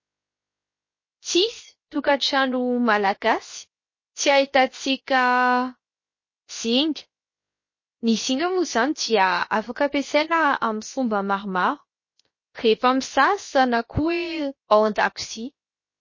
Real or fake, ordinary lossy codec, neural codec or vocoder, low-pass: fake; MP3, 32 kbps; codec, 16 kHz, 0.7 kbps, FocalCodec; 7.2 kHz